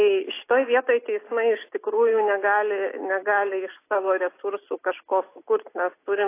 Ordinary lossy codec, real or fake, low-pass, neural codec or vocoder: AAC, 24 kbps; real; 3.6 kHz; none